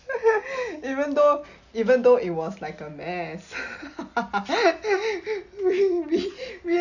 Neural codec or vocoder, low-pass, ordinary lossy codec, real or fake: none; 7.2 kHz; none; real